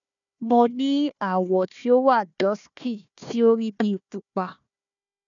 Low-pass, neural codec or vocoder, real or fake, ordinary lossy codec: 7.2 kHz; codec, 16 kHz, 1 kbps, FunCodec, trained on Chinese and English, 50 frames a second; fake; none